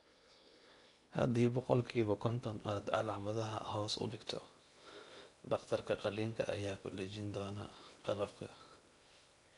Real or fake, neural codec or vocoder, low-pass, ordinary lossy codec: fake; codec, 16 kHz in and 24 kHz out, 0.8 kbps, FocalCodec, streaming, 65536 codes; 10.8 kHz; none